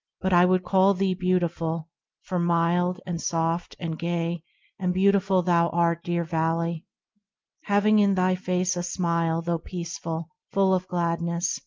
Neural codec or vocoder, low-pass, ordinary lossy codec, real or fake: none; 7.2 kHz; Opus, 32 kbps; real